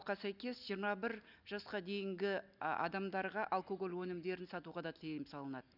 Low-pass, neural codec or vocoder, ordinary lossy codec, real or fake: 5.4 kHz; none; none; real